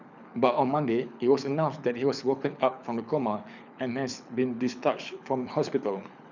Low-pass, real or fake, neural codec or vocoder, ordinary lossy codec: 7.2 kHz; fake; codec, 24 kHz, 6 kbps, HILCodec; none